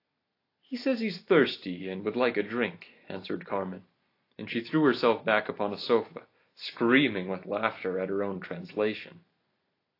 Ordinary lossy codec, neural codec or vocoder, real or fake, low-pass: AAC, 32 kbps; none; real; 5.4 kHz